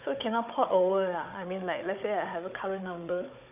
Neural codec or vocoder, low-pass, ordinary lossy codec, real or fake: codec, 16 kHz, 16 kbps, FunCodec, trained on Chinese and English, 50 frames a second; 3.6 kHz; none; fake